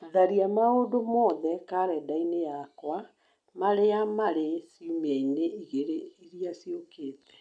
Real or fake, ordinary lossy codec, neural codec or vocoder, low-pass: real; none; none; 9.9 kHz